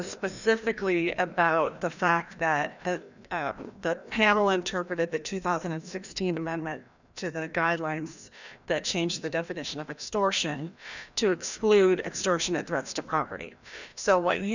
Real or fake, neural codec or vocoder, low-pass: fake; codec, 16 kHz, 1 kbps, FreqCodec, larger model; 7.2 kHz